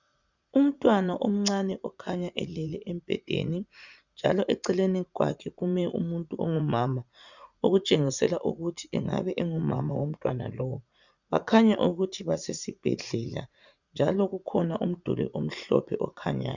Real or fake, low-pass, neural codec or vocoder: fake; 7.2 kHz; vocoder, 44.1 kHz, 80 mel bands, Vocos